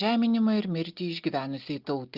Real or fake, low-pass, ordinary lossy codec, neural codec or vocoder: real; 5.4 kHz; Opus, 24 kbps; none